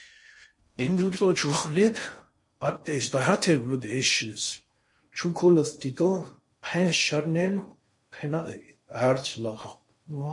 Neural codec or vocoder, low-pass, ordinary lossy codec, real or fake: codec, 16 kHz in and 24 kHz out, 0.6 kbps, FocalCodec, streaming, 4096 codes; 10.8 kHz; MP3, 48 kbps; fake